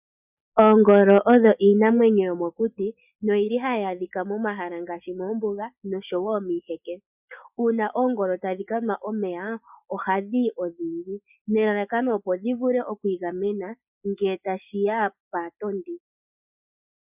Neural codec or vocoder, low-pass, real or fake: none; 3.6 kHz; real